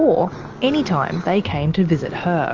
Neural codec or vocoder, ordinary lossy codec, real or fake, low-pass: none; Opus, 32 kbps; real; 7.2 kHz